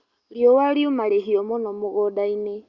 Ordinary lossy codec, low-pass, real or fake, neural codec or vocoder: Opus, 64 kbps; 7.2 kHz; real; none